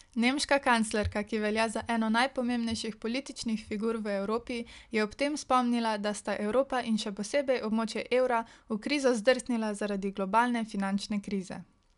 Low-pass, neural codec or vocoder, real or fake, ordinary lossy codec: 10.8 kHz; none; real; none